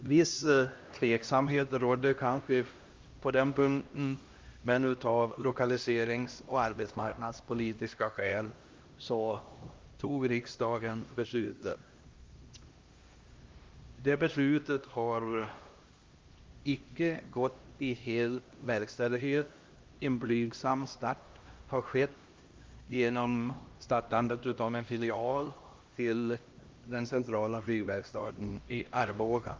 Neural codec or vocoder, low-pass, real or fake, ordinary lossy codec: codec, 16 kHz, 1 kbps, X-Codec, HuBERT features, trained on LibriSpeech; 7.2 kHz; fake; Opus, 32 kbps